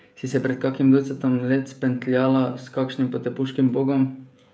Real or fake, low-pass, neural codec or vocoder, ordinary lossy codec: fake; none; codec, 16 kHz, 16 kbps, FreqCodec, smaller model; none